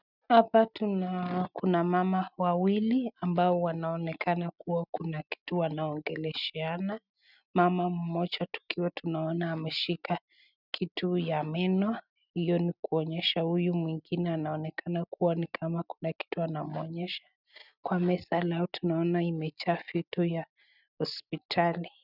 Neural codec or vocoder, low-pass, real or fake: none; 5.4 kHz; real